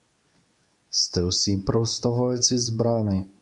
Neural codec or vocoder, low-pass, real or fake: codec, 24 kHz, 0.9 kbps, WavTokenizer, medium speech release version 2; 10.8 kHz; fake